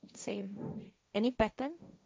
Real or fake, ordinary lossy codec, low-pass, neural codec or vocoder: fake; none; none; codec, 16 kHz, 1.1 kbps, Voila-Tokenizer